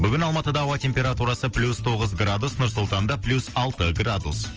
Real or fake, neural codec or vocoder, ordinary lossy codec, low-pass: real; none; Opus, 24 kbps; 7.2 kHz